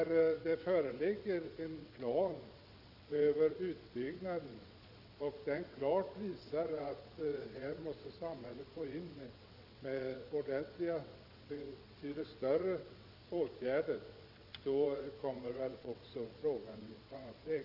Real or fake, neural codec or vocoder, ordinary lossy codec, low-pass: fake; vocoder, 22.05 kHz, 80 mel bands, WaveNeXt; none; 5.4 kHz